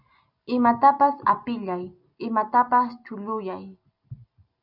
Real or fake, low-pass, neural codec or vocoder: real; 5.4 kHz; none